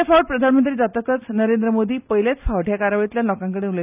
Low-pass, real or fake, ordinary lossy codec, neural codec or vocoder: 3.6 kHz; real; none; none